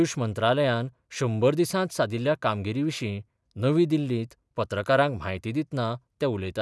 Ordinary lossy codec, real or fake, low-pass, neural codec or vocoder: none; real; none; none